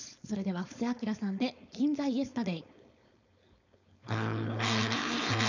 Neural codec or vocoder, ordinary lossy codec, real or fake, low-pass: codec, 16 kHz, 4.8 kbps, FACodec; none; fake; 7.2 kHz